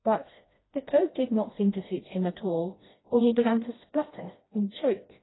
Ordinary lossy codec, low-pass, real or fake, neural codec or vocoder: AAC, 16 kbps; 7.2 kHz; fake; codec, 16 kHz, 1 kbps, FreqCodec, smaller model